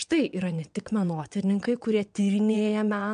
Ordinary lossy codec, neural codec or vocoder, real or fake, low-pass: MP3, 64 kbps; vocoder, 22.05 kHz, 80 mel bands, WaveNeXt; fake; 9.9 kHz